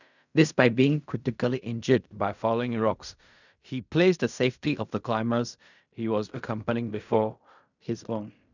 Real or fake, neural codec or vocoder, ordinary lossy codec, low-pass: fake; codec, 16 kHz in and 24 kHz out, 0.4 kbps, LongCat-Audio-Codec, fine tuned four codebook decoder; none; 7.2 kHz